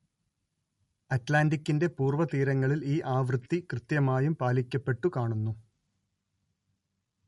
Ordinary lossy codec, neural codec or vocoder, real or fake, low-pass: MP3, 48 kbps; none; real; 19.8 kHz